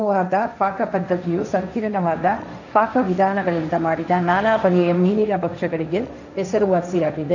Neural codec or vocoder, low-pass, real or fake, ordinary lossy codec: codec, 16 kHz, 1.1 kbps, Voila-Tokenizer; 7.2 kHz; fake; none